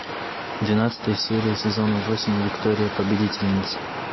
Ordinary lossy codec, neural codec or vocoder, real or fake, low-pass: MP3, 24 kbps; none; real; 7.2 kHz